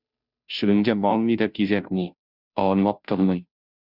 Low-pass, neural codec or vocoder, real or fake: 5.4 kHz; codec, 16 kHz, 0.5 kbps, FunCodec, trained on Chinese and English, 25 frames a second; fake